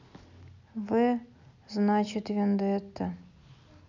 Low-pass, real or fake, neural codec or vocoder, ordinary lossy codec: 7.2 kHz; real; none; none